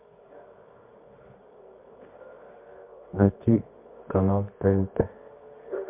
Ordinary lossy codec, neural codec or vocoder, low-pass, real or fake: MP3, 32 kbps; codec, 24 kHz, 0.9 kbps, WavTokenizer, medium music audio release; 3.6 kHz; fake